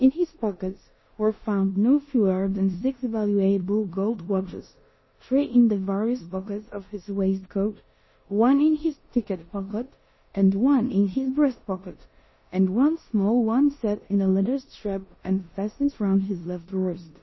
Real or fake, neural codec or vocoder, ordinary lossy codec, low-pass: fake; codec, 16 kHz in and 24 kHz out, 0.9 kbps, LongCat-Audio-Codec, four codebook decoder; MP3, 24 kbps; 7.2 kHz